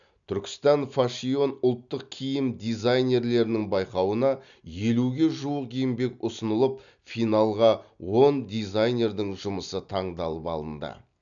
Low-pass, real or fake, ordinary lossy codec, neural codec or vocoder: 7.2 kHz; real; none; none